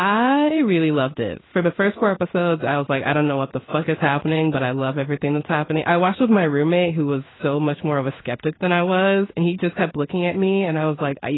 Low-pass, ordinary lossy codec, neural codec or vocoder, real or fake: 7.2 kHz; AAC, 16 kbps; codec, 16 kHz in and 24 kHz out, 1 kbps, XY-Tokenizer; fake